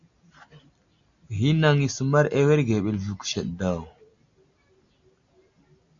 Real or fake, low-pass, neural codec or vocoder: real; 7.2 kHz; none